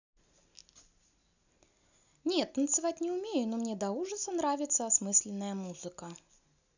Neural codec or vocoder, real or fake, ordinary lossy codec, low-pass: none; real; none; 7.2 kHz